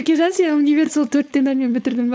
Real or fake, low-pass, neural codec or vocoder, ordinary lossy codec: fake; none; codec, 16 kHz, 4.8 kbps, FACodec; none